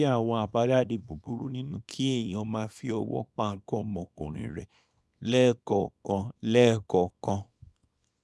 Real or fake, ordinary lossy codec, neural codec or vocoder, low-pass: fake; none; codec, 24 kHz, 0.9 kbps, WavTokenizer, small release; none